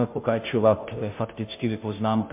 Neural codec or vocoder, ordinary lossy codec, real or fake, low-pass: codec, 16 kHz, 0.5 kbps, FunCodec, trained on Chinese and English, 25 frames a second; MP3, 32 kbps; fake; 3.6 kHz